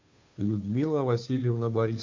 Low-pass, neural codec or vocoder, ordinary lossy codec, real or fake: 7.2 kHz; codec, 16 kHz, 2 kbps, FunCodec, trained on Chinese and English, 25 frames a second; MP3, 64 kbps; fake